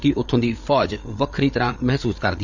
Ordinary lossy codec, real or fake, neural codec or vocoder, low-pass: none; fake; codec, 16 kHz, 8 kbps, FreqCodec, larger model; 7.2 kHz